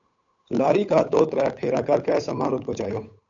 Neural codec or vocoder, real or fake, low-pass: codec, 16 kHz, 8 kbps, FunCodec, trained on Chinese and English, 25 frames a second; fake; 7.2 kHz